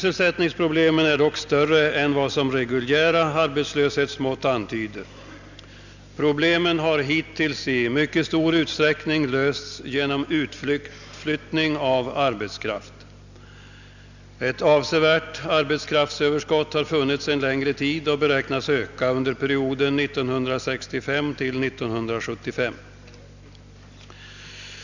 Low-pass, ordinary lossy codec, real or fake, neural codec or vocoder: 7.2 kHz; none; real; none